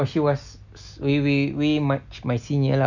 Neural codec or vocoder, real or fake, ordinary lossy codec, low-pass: none; real; none; 7.2 kHz